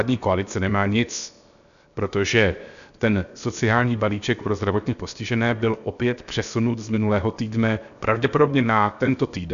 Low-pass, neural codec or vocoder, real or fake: 7.2 kHz; codec, 16 kHz, 0.7 kbps, FocalCodec; fake